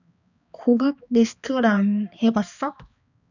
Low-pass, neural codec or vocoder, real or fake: 7.2 kHz; codec, 16 kHz, 2 kbps, X-Codec, HuBERT features, trained on balanced general audio; fake